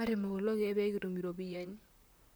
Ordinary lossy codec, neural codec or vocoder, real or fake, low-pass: none; vocoder, 44.1 kHz, 128 mel bands, Pupu-Vocoder; fake; none